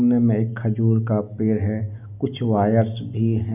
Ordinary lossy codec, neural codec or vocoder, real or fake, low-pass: none; none; real; 3.6 kHz